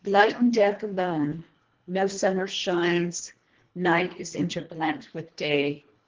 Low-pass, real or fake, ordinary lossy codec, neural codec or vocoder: 7.2 kHz; fake; Opus, 16 kbps; codec, 24 kHz, 1.5 kbps, HILCodec